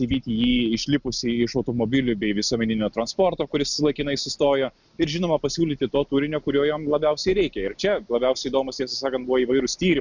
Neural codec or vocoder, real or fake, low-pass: none; real; 7.2 kHz